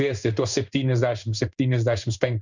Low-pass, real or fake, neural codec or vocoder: 7.2 kHz; fake; codec, 16 kHz in and 24 kHz out, 1 kbps, XY-Tokenizer